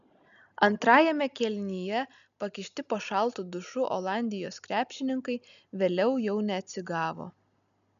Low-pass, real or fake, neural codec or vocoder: 7.2 kHz; real; none